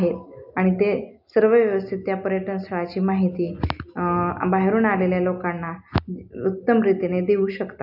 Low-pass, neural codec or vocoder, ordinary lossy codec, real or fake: 5.4 kHz; none; none; real